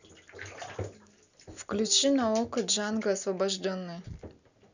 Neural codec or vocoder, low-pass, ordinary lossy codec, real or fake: none; 7.2 kHz; none; real